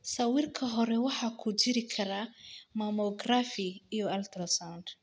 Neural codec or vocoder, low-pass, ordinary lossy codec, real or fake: none; none; none; real